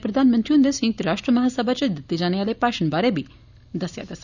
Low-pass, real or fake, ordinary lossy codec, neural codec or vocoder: 7.2 kHz; real; none; none